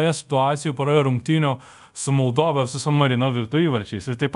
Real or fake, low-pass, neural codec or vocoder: fake; 10.8 kHz; codec, 24 kHz, 0.5 kbps, DualCodec